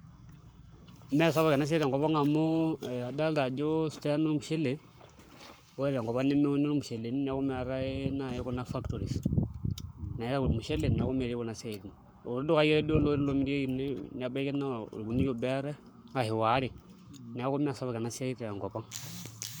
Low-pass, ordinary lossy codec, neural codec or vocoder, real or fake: none; none; codec, 44.1 kHz, 7.8 kbps, Pupu-Codec; fake